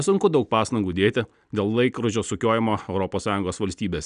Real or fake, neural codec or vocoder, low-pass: real; none; 9.9 kHz